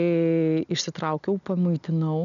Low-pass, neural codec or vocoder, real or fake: 7.2 kHz; none; real